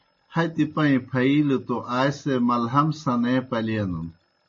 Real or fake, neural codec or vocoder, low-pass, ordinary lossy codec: real; none; 7.2 kHz; MP3, 32 kbps